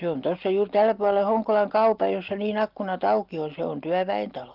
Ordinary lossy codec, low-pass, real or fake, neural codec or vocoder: Opus, 32 kbps; 5.4 kHz; real; none